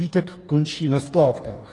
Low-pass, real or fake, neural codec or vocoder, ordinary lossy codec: 10.8 kHz; fake; codec, 44.1 kHz, 2.6 kbps, DAC; AAC, 32 kbps